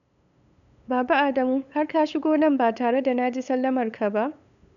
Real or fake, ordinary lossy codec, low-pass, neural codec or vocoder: fake; none; 7.2 kHz; codec, 16 kHz, 8 kbps, FunCodec, trained on LibriTTS, 25 frames a second